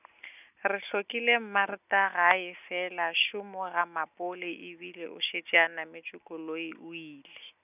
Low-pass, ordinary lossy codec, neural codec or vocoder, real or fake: 3.6 kHz; none; none; real